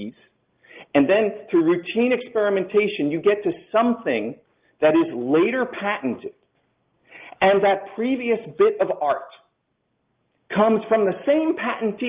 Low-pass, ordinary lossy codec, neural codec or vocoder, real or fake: 3.6 kHz; Opus, 32 kbps; none; real